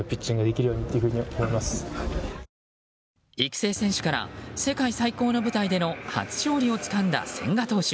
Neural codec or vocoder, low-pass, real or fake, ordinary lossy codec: none; none; real; none